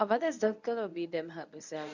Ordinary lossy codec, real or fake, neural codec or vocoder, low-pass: none; fake; codec, 24 kHz, 0.9 kbps, WavTokenizer, medium speech release version 1; 7.2 kHz